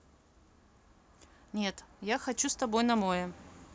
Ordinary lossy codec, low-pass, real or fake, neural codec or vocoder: none; none; real; none